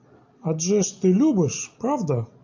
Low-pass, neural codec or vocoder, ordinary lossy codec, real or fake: 7.2 kHz; none; AAC, 48 kbps; real